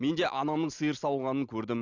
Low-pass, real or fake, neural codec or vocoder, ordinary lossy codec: 7.2 kHz; real; none; none